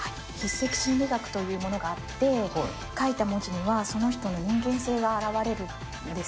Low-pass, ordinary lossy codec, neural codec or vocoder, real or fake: none; none; none; real